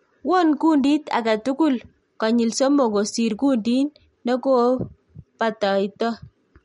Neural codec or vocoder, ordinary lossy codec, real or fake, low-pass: none; MP3, 48 kbps; real; 19.8 kHz